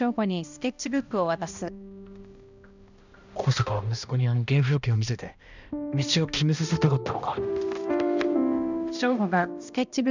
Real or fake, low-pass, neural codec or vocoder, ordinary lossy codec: fake; 7.2 kHz; codec, 16 kHz, 1 kbps, X-Codec, HuBERT features, trained on balanced general audio; none